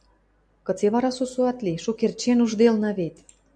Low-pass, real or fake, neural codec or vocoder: 9.9 kHz; real; none